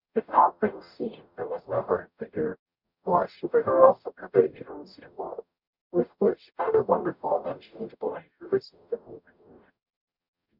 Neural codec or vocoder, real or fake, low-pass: codec, 44.1 kHz, 0.9 kbps, DAC; fake; 5.4 kHz